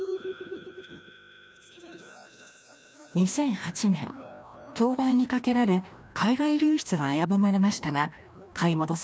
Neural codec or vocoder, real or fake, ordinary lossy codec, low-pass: codec, 16 kHz, 1 kbps, FreqCodec, larger model; fake; none; none